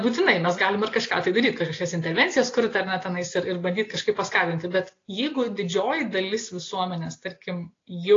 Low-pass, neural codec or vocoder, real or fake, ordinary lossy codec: 7.2 kHz; none; real; AAC, 32 kbps